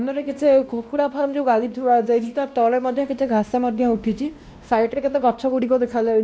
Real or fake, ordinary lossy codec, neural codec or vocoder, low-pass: fake; none; codec, 16 kHz, 1 kbps, X-Codec, WavLM features, trained on Multilingual LibriSpeech; none